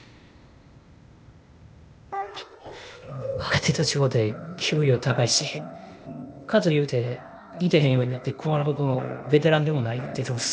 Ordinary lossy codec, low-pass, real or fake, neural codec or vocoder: none; none; fake; codec, 16 kHz, 0.8 kbps, ZipCodec